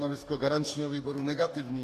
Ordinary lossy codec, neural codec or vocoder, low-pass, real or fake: AAC, 64 kbps; codec, 44.1 kHz, 2.6 kbps, DAC; 14.4 kHz; fake